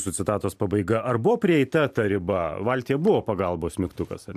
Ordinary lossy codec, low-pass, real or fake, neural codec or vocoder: AAC, 96 kbps; 14.4 kHz; fake; vocoder, 44.1 kHz, 128 mel bands every 512 samples, BigVGAN v2